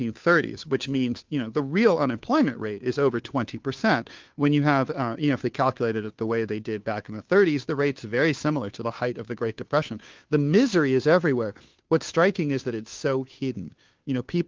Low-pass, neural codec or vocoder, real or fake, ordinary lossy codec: 7.2 kHz; codec, 16 kHz, 2 kbps, FunCodec, trained on Chinese and English, 25 frames a second; fake; Opus, 32 kbps